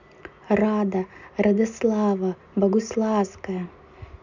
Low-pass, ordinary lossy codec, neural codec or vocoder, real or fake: 7.2 kHz; none; none; real